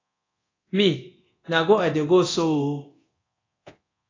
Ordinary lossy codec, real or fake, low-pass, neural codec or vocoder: AAC, 32 kbps; fake; 7.2 kHz; codec, 24 kHz, 0.9 kbps, DualCodec